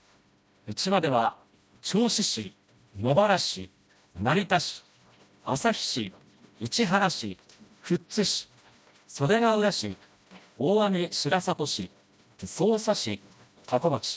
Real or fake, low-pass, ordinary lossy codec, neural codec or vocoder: fake; none; none; codec, 16 kHz, 1 kbps, FreqCodec, smaller model